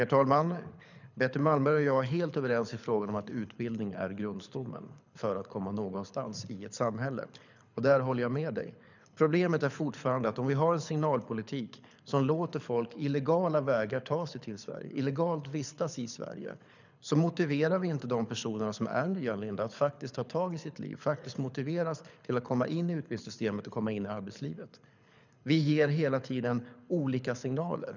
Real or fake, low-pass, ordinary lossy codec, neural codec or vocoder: fake; 7.2 kHz; none; codec, 24 kHz, 6 kbps, HILCodec